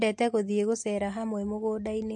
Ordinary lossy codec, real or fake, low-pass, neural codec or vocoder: MP3, 48 kbps; real; 10.8 kHz; none